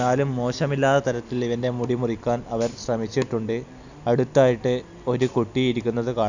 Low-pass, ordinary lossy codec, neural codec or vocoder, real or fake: 7.2 kHz; none; none; real